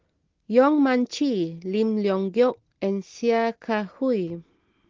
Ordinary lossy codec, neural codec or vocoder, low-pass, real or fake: Opus, 16 kbps; none; 7.2 kHz; real